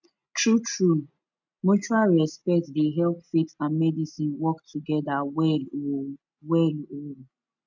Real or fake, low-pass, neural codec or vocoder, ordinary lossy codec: real; 7.2 kHz; none; none